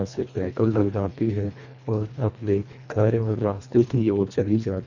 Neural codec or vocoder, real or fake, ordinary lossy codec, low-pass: codec, 24 kHz, 1.5 kbps, HILCodec; fake; none; 7.2 kHz